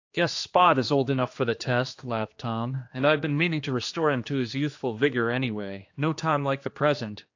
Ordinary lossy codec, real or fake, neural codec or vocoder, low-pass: AAC, 48 kbps; fake; codec, 16 kHz, 2 kbps, X-Codec, HuBERT features, trained on general audio; 7.2 kHz